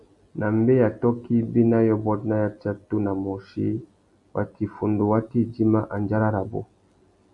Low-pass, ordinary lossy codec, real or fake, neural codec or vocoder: 10.8 kHz; AAC, 64 kbps; real; none